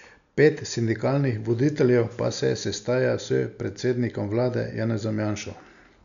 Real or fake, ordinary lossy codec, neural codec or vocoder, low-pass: real; none; none; 7.2 kHz